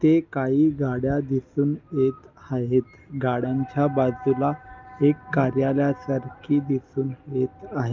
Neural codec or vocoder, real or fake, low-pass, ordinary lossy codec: none; real; 7.2 kHz; Opus, 32 kbps